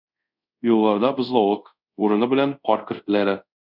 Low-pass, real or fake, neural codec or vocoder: 5.4 kHz; fake; codec, 24 kHz, 0.5 kbps, DualCodec